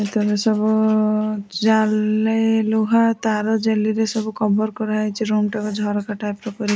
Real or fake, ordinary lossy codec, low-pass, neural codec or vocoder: real; none; none; none